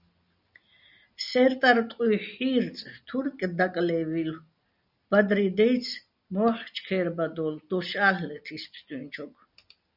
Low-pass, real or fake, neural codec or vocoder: 5.4 kHz; real; none